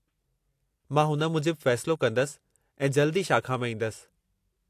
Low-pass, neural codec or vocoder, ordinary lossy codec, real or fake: 14.4 kHz; none; AAC, 64 kbps; real